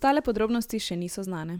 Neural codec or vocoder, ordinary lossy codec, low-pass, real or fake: none; none; none; real